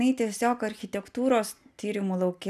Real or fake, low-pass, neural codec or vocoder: real; 14.4 kHz; none